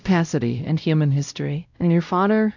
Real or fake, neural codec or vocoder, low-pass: fake; codec, 16 kHz, 0.5 kbps, X-Codec, WavLM features, trained on Multilingual LibriSpeech; 7.2 kHz